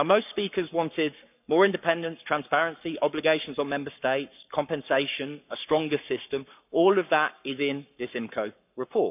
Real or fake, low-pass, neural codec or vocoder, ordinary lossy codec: fake; 3.6 kHz; codec, 44.1 kHz, 7.8 kbps, Pupu-Codec; none